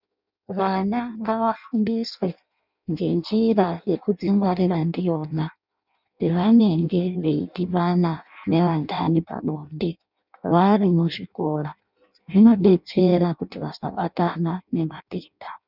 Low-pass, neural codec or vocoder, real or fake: 5.4 kHz; codec, 16 kHz in and 24 kHz out, 0.6 kbps, FireRedTTS-2 codec; fake